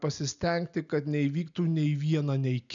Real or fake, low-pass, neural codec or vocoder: real; 7.2 kHz; none